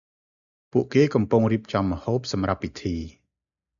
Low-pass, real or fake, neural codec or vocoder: 7.2 kHz; real; none